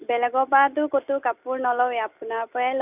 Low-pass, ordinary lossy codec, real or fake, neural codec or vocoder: 3.6 kHz; none; real; none